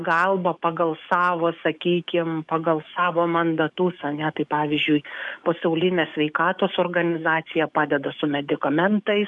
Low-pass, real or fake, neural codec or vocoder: 10.8 kHz; fake; codec, 44.1 kHz, 7.8 kbps, DAC